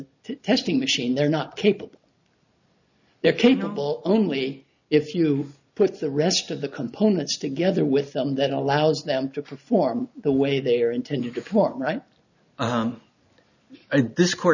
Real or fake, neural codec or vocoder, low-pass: real; none; 7.2 kHz